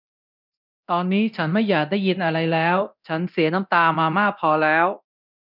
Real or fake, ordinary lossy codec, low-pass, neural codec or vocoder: fake; none; 5.4 kHz; codec, 24 kHz, 0.9 kbps, DualCodec